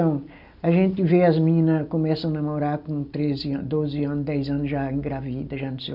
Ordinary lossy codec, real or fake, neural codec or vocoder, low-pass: none; real; none; 5.4 kHz